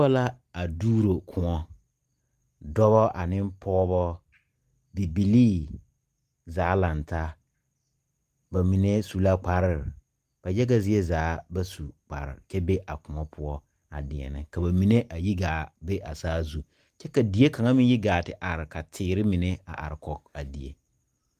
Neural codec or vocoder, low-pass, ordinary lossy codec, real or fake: none; 14.4 kHz; Opus, 32 kbps; real